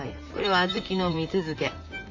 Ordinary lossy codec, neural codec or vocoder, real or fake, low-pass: AAC, 48 kbps; vocoder, 44.1 kHz, 128 mel bands, Pupu-Vocoder; fake; 7.2 kHz